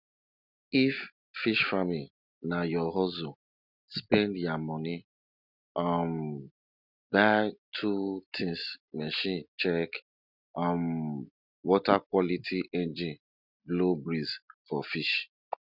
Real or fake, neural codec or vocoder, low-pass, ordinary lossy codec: real; none; 5.4 kHz; none